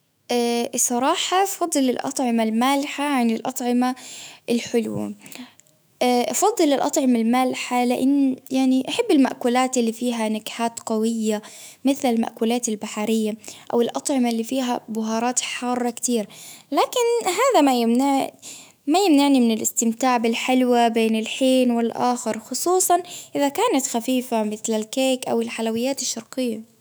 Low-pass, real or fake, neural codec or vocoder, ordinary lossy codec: none; fake; autoencoder, 48 kHz, 128 numbers a frame, DAC-VAE, trained on Japanese speech; none